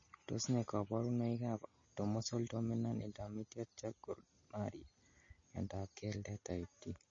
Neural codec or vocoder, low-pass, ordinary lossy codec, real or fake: none; 7.2 kHz; MP3, 32 kbps; real